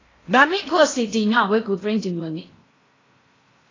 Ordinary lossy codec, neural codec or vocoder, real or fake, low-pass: AAC, 32 kbps; codec, 16 kHz in and 24 kHz out, 0.6 kbps, FocalCodec, streaming, 4096 codes; fake; 7.2 kHz